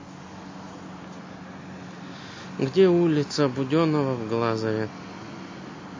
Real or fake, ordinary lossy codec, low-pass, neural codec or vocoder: real; MP3, 32 kbps; 7.2 kHz; none